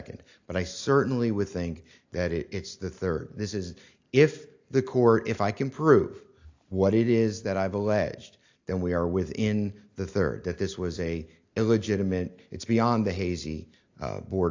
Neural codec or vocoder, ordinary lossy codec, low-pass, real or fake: none; AAC, 48 kbps; 7.2 kHz; real